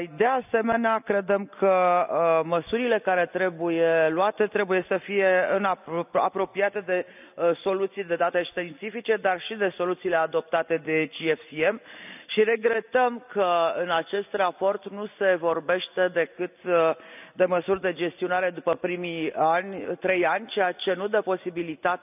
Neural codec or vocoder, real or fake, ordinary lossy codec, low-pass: none; real; none; 3.6 kHz